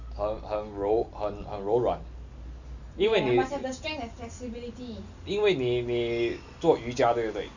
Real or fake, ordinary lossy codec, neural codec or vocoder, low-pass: real; none; none; 7.2 kHz